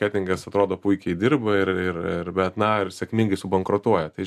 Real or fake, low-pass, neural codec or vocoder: real; 14.4 kHz; none